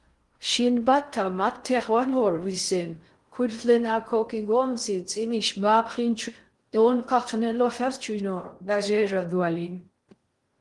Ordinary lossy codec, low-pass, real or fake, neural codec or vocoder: Opus, 24 kbps; 10.8 kHz; fake; codec, 16 kHz in and 24 kHz out, 0.6 kbps, FocalCodec, streaming, 4096 codes